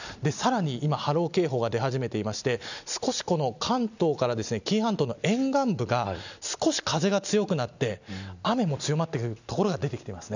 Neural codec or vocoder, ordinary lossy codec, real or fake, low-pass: none; none; real; 7.2 kHz